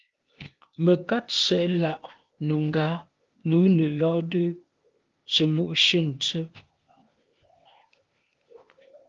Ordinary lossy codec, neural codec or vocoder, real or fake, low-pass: Opus, 32 kbps; codec, 16 kHz, 0.8 kbps, ZipCodec; fake; 7.2 kHz